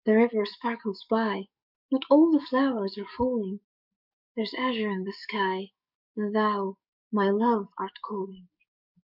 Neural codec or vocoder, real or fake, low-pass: codec, 44.1 kHz, 7.8 kbps, DAC; fake; 5.4 kHz